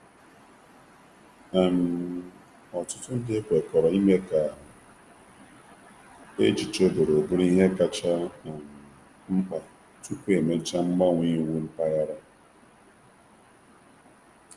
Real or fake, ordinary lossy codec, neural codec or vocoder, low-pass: real; Opus, 32 kbps; none; 10.8 kHz